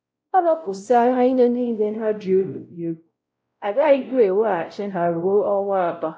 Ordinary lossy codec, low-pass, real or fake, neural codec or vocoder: none; none; fake; codec, 16 kHz, 0.5 kbps, X-Codec, WavLM features, trained on Multilingual LibriSpeech